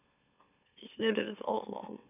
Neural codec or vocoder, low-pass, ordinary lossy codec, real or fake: autoencoder, 44.1 kHz, a latent of 192 numbers a frame, MeloTTS; 3.6 kHz; none; fake